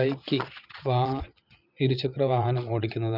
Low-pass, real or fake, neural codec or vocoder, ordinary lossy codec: 5.4 kHz; fake; vocoder, 22.05 kHz, 80 mel bands, Vocos; none